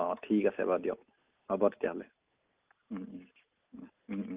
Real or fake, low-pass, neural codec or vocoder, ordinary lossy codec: real; 3.6 kHz; none; Opus, 24 kbps